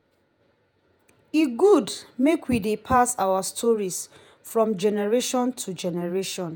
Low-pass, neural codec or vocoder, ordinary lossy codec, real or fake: none; vocoder, 48 kHz, 128 mel bands, Vocos; none; fake